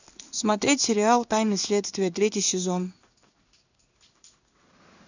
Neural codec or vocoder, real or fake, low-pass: codec, 16 kHz in and 24 kHz out, 1 kbps, XY-Tokenizer; fake; 7.2 kHz